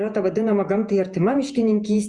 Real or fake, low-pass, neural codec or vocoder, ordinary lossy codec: fake; 10.8 kHz; vocoder, 48 kHz, 128 mel bands, Vocos; Opus, 64 kbps